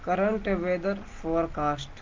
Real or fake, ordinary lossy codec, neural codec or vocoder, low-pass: real; Opus, 32 kbps; none; 7.2 kHz